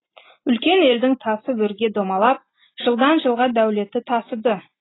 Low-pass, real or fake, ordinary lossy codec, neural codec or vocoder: 7.2 kHz; real; AAC, 16 kbps; none